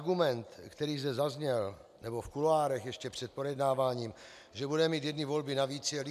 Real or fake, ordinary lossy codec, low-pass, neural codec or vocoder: real; MP3, 96 kbps; 14.4 kHz; none